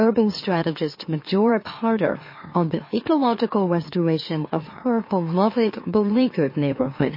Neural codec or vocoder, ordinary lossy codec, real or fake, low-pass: autoencoder, 44.1 kHz, a latent of 192 numbers a frame, MeloTTS; MP3, 24 kbps; fake; 5.4 kHz